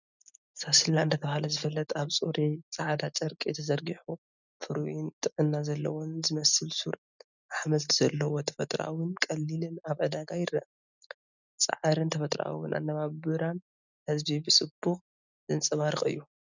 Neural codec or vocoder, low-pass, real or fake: none; 7.2 kHz; real